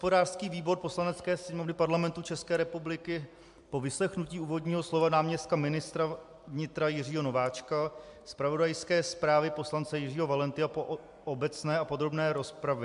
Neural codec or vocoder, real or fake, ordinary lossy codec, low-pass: none; real; MP3, 64 kbps; 10.8 kHz